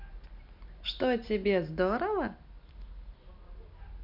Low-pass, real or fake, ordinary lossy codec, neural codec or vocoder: 5.4 kHz; real; MP3, 48 kbps; none